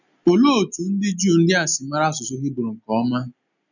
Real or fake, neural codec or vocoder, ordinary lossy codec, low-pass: real; none; none; 7.2 kHz